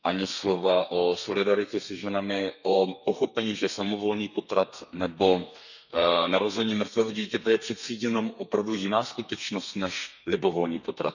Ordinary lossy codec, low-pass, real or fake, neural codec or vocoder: none; 7.2 kHz; fake; codec, 32 kHz, 1.9 kbps, SNAC